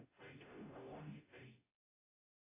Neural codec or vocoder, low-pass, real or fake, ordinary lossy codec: codec, 44.1 kHz, 0.9 kbps, DAC; 3.6 kHz; fake; AAC, 16 kbps